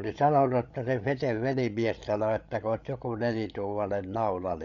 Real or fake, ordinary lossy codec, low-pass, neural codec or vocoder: fake; none; 7.2 kHz; codec, 16 kHz, 8 kbps, FreqCodec, larger model